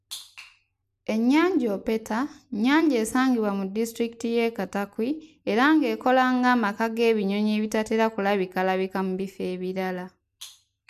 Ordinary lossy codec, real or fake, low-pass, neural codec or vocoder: AAC, 96 kbps; real; 14.4 kHz; none